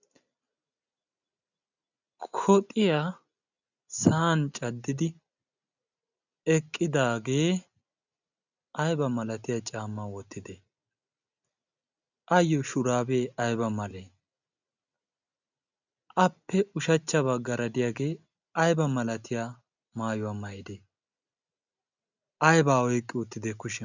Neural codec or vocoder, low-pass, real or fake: none; 7.2 kHz; real